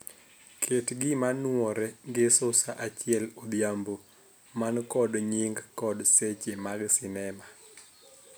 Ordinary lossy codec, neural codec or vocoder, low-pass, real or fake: none; none; none; real